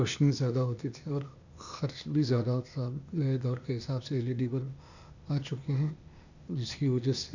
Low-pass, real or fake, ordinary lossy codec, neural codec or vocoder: 7.2 kHz; fake; none; codec, 16 kHz, 0.8 kbps, ZipCodec